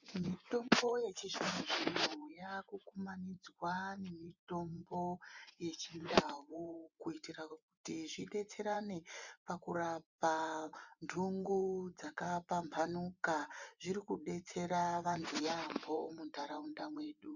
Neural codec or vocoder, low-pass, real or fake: vocoder, 24 kHz, 100 mel bands, Vocos; 7.2 kHz; fake